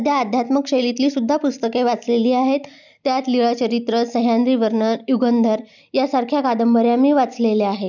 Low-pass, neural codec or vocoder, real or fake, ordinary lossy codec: 7.2 kHz; none; real; none